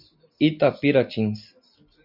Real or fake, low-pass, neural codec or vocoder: real; 5.4 kHz; none